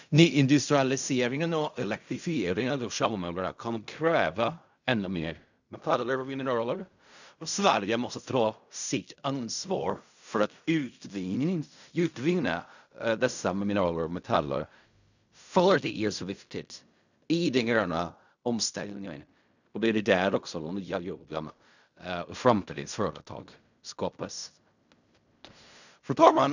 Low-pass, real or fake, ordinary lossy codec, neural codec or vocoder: 7.2 kHz; fake; none; codec, 16 kHz in and 24 kHz out, 0.4 kbps, LongCat-Audio-Codec, fine tuned four codebook decoder